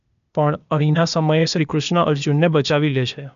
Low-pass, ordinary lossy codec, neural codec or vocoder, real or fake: 7.2 kHz; none; codec, 16 kHz, 0.8 kbps, ZipCodec; fake